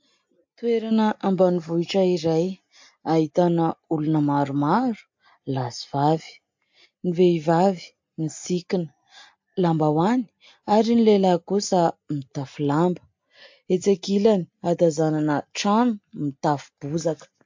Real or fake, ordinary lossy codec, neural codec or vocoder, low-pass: real; MP3, 48 kbps; none; 7.2 kHz